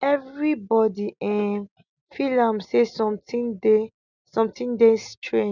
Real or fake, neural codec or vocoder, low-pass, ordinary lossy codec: real; none; 7.2 kHz; none